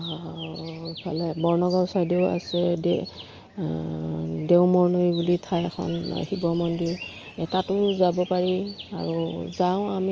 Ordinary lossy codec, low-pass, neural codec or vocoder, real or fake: Opus, 24 kbps; 7.2 kHz; none; real